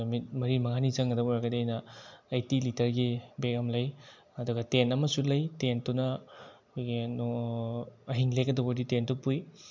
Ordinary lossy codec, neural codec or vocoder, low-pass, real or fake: MP3, 64 kbps; none; 7.2 kHz; real